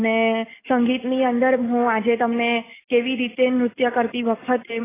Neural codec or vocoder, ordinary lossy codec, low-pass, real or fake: none; AAC, 16 kbps; 3.6 kHz; real